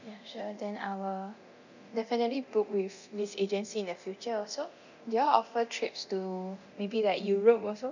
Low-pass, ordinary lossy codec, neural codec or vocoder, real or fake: 7.2 kHz; none; codec, 24 kHz, 0.9 kbps, DualCodec; fake